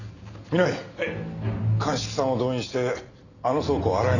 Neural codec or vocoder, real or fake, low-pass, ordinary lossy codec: none; real; 7.2 kHz; none